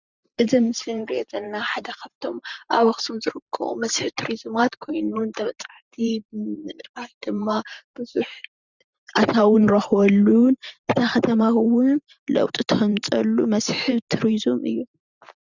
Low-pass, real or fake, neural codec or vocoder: 7.2 kHz; fake; vocoder, 44.1 kHz, 128 mel bands every 512 samples, BigVGAN v2